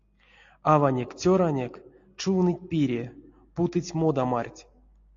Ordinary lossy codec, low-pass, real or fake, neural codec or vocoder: AAC, 48 kbps; 7.2 kHz; real; none